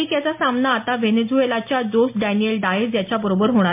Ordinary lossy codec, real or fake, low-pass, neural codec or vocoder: MP3, 32 kbps; real; 3.6 kHz; none